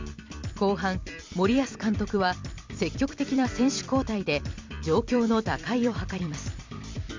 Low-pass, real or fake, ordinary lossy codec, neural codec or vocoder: 7.2 kHz; fake; MP3, 64 kbps; vocoder, 44.1 kHz, 128 mel bands every 256 samples, BigVGAN v2